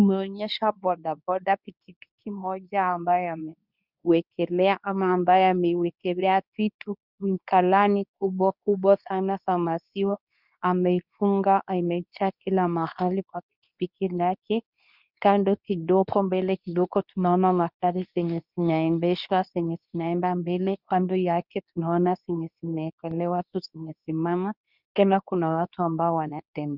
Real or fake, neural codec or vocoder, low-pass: fake; codec, 24 kHz, 0.9 kbps, WavTokenizer, medium speech release version 2; 5.4 kHz